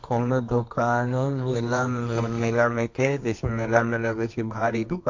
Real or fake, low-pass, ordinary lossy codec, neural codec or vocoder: fake; 7.2 kHz; MP3, 48 kbps; codec, 24 kHz, 0.9 kbps, WavTokenizer, medium music audio release